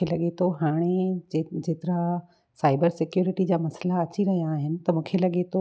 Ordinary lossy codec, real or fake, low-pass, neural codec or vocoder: none; real; none; none